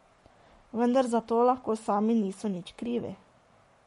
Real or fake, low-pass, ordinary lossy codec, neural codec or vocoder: fake; 19.8 kHz; MP3, 48 kbps; codec, 44.1 kHz, 7.8 kbps, Pupu-Codec